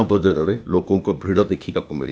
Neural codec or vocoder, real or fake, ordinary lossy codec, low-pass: codec, 16 kHz, 0.8 kbps, ZipCodec; fake; none; none